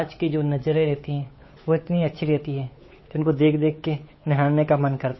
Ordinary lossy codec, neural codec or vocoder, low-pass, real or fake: MP3, 24 kbps; codec, 16 kHz, 8 kbps, FunCodec, trained on Chinese and English, 25 frames a second; 7.2 kHz; fake